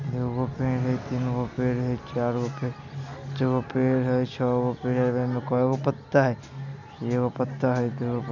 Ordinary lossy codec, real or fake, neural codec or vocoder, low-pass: none; real; none; 7.2 kHz